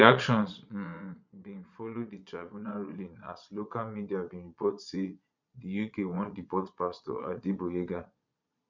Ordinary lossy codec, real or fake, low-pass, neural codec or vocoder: none; fake; 7.2 kHz; vocoder, 22.05 kHz, 80 mel bands, Vocos